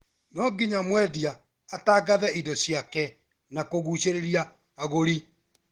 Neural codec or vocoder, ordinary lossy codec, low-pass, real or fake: none; Opus, 16 kbps; 19.8 kHz; real